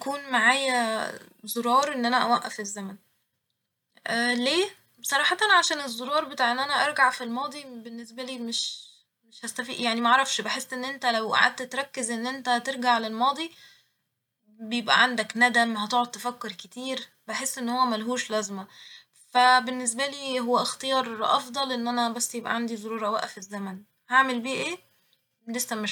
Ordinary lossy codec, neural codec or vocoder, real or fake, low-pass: none; none; real; 19.8 kHz